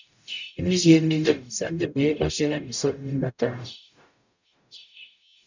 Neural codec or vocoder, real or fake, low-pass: codec, 44.1 kHz, 0.9 kbps, DAC; fake; 7.2 kHz